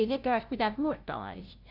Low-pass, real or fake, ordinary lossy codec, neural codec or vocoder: 5.4 kHz; fake; AAC, 48 kbps; codec, 16 kHz, 0.5 kbps, FunCodec, trained on LibriTTS, 25 frames a second